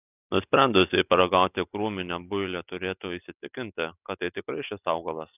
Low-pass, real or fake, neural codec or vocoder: 3.6 kHz; real; none